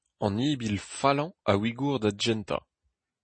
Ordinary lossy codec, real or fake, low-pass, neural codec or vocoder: MP3, 32 kbps; real; 9.9 kHz; none